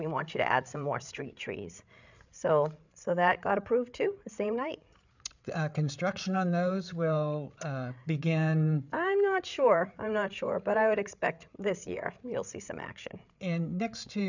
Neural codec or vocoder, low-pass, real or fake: codec, 16 kHz, 8 kbps, FreqCodec, larger model; 7.2 kHz; fake